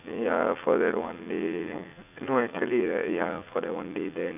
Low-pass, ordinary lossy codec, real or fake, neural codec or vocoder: 3.6 kHz; none; fake; vocoder, 44.1 kHz, 80 mel bands, Vocos